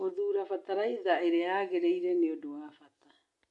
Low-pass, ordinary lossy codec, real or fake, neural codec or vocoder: 10.8 kHz; AAC, 64 kbps; real; none